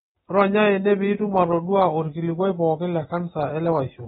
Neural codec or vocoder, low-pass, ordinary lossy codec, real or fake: none; 19.8 kHz; AAC, 16 kbps; real